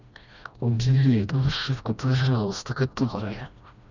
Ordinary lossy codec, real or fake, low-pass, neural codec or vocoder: none; fake; 7.2 kHz; codec, 16 kHz, 1 kbps, FreqCodec, smaller model